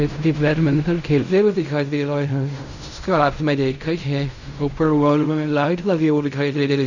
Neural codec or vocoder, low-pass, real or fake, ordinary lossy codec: codec, 16 kHz in and 24 kHz out, 0.4 kbps, LongCat-Audio-Codec, fine tuned four codebook decoder; 7.2 kHz; fake; none